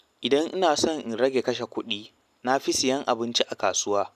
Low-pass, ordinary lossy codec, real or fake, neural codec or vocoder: 14.4 kHz; none; real; none